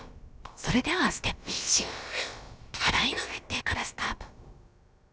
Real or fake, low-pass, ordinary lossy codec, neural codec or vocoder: fake; none; none; codec, 16 kHz, about 1 kbps, DyCAST, with the encoder's durations